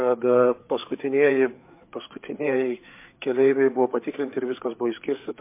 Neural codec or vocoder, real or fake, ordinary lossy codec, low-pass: codec, 16 kHz, 4 kbps, FreqCodec, larger model; fake; MP3, 24 kbps; 3.6 kHz